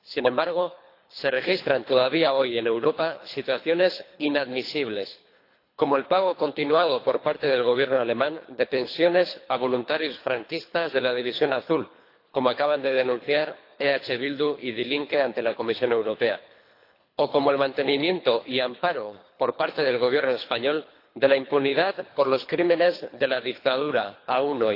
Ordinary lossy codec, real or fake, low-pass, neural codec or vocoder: AAC, 32 kbps; fake; 5.4 kHz; codec, 24 kHz, 3 kbps, HILCodec